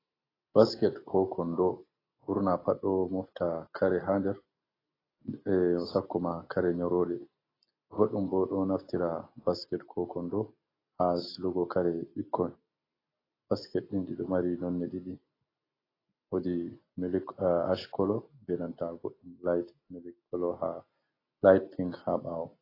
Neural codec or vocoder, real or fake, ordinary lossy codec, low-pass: none; real; AAC, 24 kbps; 5.4 kHz